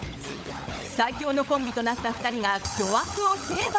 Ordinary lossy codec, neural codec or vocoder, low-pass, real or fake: none; codec, 16 kHz, 16 kbps, FunCodec, trained on LibriTTS, 50 frames a second; none; fake